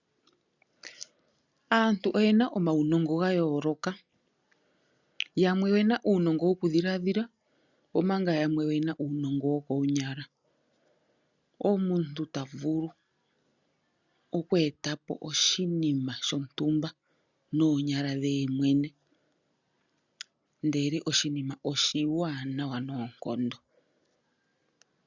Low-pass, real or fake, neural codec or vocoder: 7.2 kHz; real; none